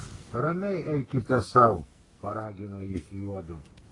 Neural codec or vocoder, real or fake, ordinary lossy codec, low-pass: codec, 44.1 kHz, 2.6 kbps, SNAC; fake; AAC, 32 kbps; 10.8 kHz